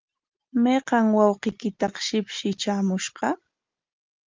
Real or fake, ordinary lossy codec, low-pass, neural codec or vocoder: real; Opus, 24 kbps; 7.2 kHz; none